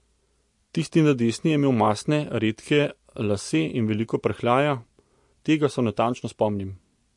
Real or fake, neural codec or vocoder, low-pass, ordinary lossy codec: real; none; 10.8 kHz; MP3, 48 kbps